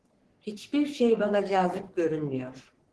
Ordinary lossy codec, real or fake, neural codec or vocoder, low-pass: Opus, 16 kbps; fake; codec, 44.1 kHz, 3.4 kbps, Pupu-Codec; 10.8 kHz